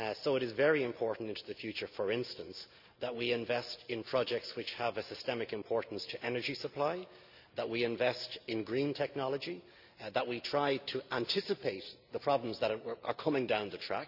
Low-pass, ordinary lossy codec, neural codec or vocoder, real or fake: 5.4 kHz; none; none; real